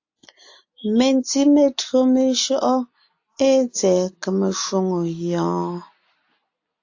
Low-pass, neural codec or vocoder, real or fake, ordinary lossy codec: 7.2 kHz; none; real; AAC, 48 kbps